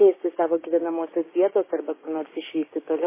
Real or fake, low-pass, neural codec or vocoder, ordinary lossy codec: fake; 3.6 kHz; codec, 16 kHz, 6 kbps, DAC; MP3, 16 kbps